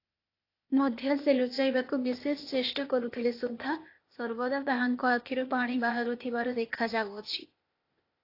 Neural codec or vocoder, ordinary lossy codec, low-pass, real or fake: codec, 16 kHz, 0.8 kbps, ZipCodec; AAC, 32 kbps; 5.4 kHz; fake